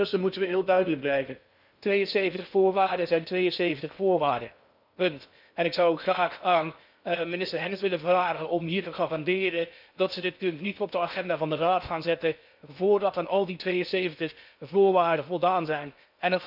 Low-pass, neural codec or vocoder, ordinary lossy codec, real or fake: 5.4 kHz; codec, 16 kHz in and 24 kHz out, 0.6 kbps, FocalCodec, streaming, 2048 codes; none; fake